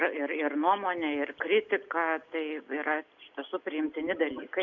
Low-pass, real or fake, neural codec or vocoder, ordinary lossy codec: 7.2 kHz; real; none; MP3, 64 kbps